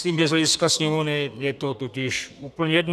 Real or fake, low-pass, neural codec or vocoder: fake; 14.4 kHz; codec, 32 kHz, 1.9 kbps, SNAC